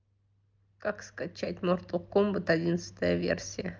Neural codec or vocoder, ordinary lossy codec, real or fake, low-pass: none; Opus, 24 kbps; real; 7.2 kHz